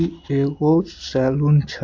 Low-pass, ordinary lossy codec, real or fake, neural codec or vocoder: 7.2 kHz; AAC, 48 kbps; real; none